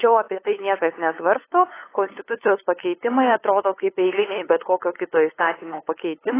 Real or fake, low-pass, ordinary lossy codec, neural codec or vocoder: fake; 3.6 kHz; AAC, 16 kbps; codec, 16 kHz, 8 kbps, FunCodec, trained on LibriTTS, 25 frames a second